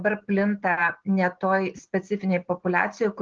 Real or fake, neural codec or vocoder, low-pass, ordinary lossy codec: real; none; 7.2 kHz; Opus, 16 kbps